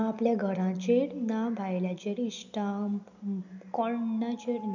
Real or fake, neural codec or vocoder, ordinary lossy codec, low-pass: real; none; none; 7.2 kHz